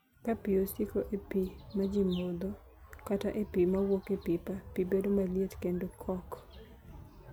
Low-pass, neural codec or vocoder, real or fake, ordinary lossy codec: none; none; real; none